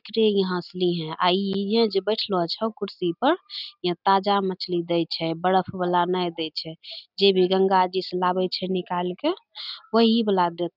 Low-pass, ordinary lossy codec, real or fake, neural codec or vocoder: 5.4 kHz; none; real; none